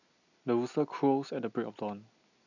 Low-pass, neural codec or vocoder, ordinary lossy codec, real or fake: 7.2 kHz; none; none; real